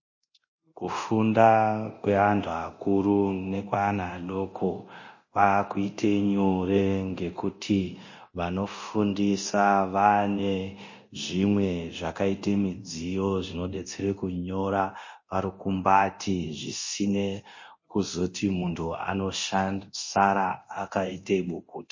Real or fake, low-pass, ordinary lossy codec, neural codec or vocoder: fake; 7.2 kHz; MP3, 32 kbps; codec, 24 kHz, 0.9 kbps, DualCodec